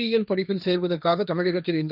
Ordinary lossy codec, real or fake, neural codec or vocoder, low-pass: none; fake; codec, 16 kHz, 1.1 kbps, Voila-Tokenizer; 5.4 kHz